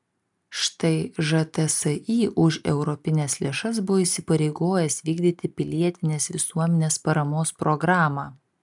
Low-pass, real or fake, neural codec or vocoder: 10.8 kHz; real; none